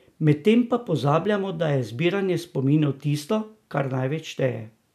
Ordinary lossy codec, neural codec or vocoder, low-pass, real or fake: none; none; 14.4 kHz; real